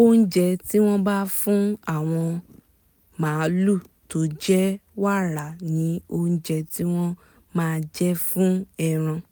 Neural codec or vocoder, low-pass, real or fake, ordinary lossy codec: none; none; real; none